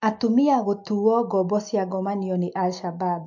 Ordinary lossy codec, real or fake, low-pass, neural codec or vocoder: MP3, 48 kbps; real; 7.2 kHz; none